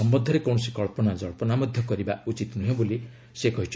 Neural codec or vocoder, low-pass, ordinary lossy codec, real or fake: none; none; none; real